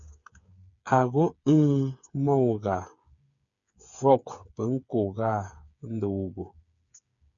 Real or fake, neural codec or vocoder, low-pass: fake; codec, 16 kHz, 8 kbps, FreqCodec, smaller model; 7.2 kHz